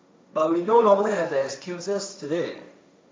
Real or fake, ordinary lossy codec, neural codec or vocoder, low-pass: fake; none; codec, 16 kHz, 1.1 kbps, Voila-Tokenizer; none